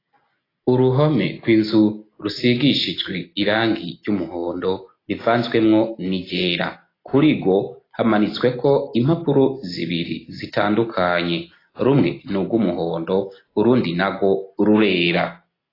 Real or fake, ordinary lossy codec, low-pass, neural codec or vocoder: real; AAC, 24 kbps; 5.4 kHz; none